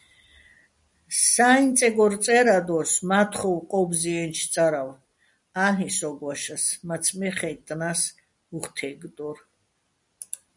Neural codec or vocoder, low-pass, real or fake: none; 10.8 kHz; real